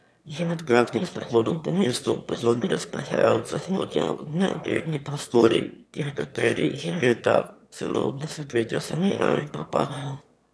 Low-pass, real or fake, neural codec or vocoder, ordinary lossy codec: none; fake; autoencoder, 22.05 kHz, a latent of 192 numbers a frame, VITS, trained on one speaker; none